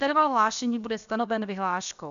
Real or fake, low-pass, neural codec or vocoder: fake; 7.2 kHz; codec, 16 kHz, about 1 kbps, DyCAST, with the encoder's durations